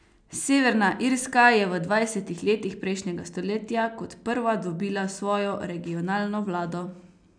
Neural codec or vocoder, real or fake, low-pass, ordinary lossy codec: none; real; 9.9 kHz; none